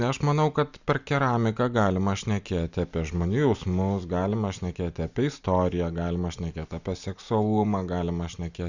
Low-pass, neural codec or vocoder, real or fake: 7.2 kHz; none; real